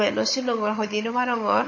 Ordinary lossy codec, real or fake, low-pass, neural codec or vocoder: MP3, 32 kbps; fake; 7.2 kHz; codec, 16 kHz, 16 kbps, FunCodec, trained on Chinese and English, 50 frames a second